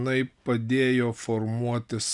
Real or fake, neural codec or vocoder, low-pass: real; none; 10.8 kHz